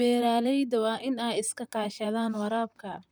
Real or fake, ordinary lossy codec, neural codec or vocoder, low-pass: fake; none; vocoder, 44.1 kHz, 128 mel bands, Pupu-Vocoder; none